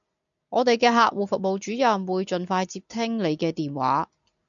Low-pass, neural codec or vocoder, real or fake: 7.2 kHz; none; real